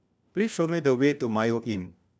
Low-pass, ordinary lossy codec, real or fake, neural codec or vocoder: none; none; fake; codec, 16 kHz, 1 kbps, FunCodec, trained on LibriTTS, 50 frames a second